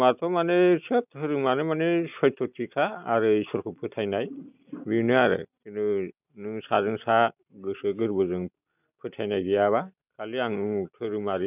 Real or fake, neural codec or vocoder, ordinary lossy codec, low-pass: real; none; none; 3.6 kHz